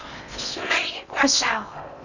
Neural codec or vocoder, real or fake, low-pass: codec, 16 kHz in and 24 kHz out, 0.6 kbps, FocalCodec, streaming, 2048 codes; fake; 7.2 kHz